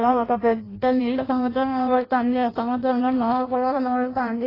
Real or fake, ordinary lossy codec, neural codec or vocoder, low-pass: fake; AAC, 24 kbps; codec, 16 kHz in and 24 kHz out, 0.6 kbps, FireRedTTS-2 codec; 5.4 kHz